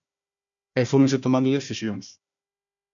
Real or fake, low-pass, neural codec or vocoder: fake; 7.2 kHz; codec, 16 kHz, 1 kbps, FunCodec, trained on Chinese and English, 50 frames a second